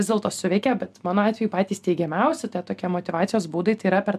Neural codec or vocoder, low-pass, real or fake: none; 14.4 kHz; real